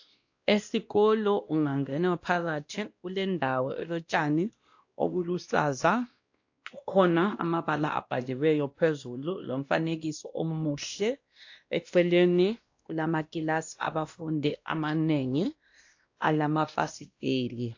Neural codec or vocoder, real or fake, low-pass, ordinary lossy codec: codec, 16 kHz, 1 kbps, X-Codec, WavLM features, trained on Multilingual LibriSpeech; fake; 7.2 kHz; AAC, 48 kbps